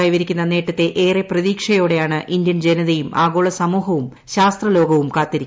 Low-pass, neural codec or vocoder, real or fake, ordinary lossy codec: none; none; real; none